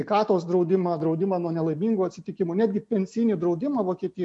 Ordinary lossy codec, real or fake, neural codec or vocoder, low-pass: MP3, 48 kbps; real; none; 10.8 kHz